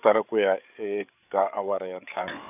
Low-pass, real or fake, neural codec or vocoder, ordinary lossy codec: 3.6 kHz; fake; codec, 16 kHz, 8 kbps, FreqCodec, larger model; none